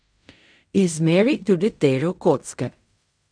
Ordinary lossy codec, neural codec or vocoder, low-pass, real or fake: none; codec, 16 kHz in and 24 kHz out, 0.4 kbps, LongCat-Audio-Codec, fine tuned four codebook decoder; 9.9 kHz; fake